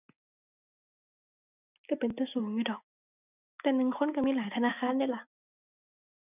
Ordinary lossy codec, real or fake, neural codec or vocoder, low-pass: none; real; none; 3.6 kHz